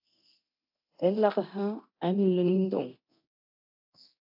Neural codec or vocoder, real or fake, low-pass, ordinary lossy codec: codec, 24 kHz, 0.9 kbps, DualCodec; fake; 5.4 kHz; AAC, 48 kbps